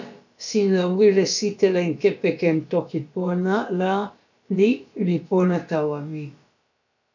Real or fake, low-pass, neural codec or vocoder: fake; 7.2 kHz; codec, 16 kHz, about 1 kbps, DyCAST, with the encoder's durations